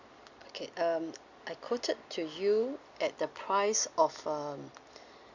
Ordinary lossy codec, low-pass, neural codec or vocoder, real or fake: none; 7.2 kHz; none; real